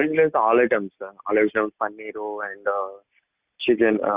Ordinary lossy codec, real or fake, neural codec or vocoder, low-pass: Opus, 64 kbps; real; none; 3.6 kHz